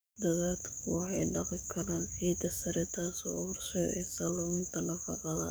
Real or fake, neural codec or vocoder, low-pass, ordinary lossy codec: fake; codec, 44.1 kHz, 7.8 kbps, DAC; none; none